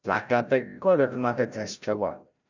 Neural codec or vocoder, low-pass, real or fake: codec, 16 kHz, 0.5 kbps, FreqCodec, larger model; 7.2 kHz; fake